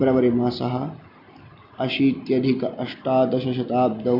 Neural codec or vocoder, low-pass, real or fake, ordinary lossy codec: none; 5.4 kHz; real; none